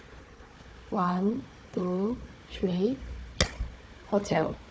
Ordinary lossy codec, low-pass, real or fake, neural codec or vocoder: none; none; fake; codec, 16 kHz, 4 kbps, FunCodec, trained on Chinese and English, 50 frames a second